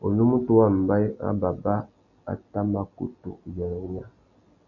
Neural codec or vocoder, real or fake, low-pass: none; real; 7.2 kHz